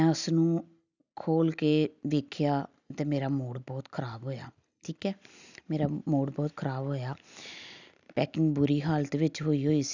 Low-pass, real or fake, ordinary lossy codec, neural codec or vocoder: 7.2 kHz; real; none; none